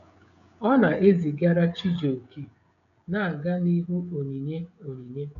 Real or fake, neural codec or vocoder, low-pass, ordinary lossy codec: fake; codec, 16 kHz, 16 kbps, FreqCodec, smaller model; 7.2 kHz; none